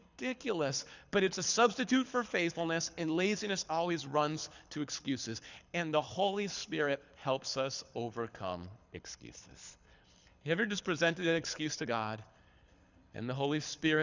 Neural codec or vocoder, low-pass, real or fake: codec, 24 kHz, 6 kbps, HILCodec; 7.2 kHz; fake